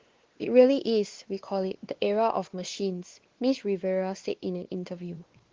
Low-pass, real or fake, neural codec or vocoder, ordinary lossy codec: 7.2 kHz; fake; codec, 16 kHz, 2 kbps, X-Codec, WavLM features, trained on Multilingual LibriSpeech; Opus, 16 kbps